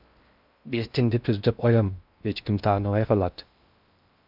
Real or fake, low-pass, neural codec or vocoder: fake; 5.4 kHz; codec, 16 kHz in and 24 kHz out, 0.6 kbps, FocalCodec, streaming, 4096 codes